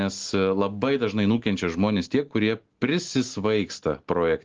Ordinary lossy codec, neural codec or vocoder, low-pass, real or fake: Opus, 16 kbps; none; 7.2 kHz; real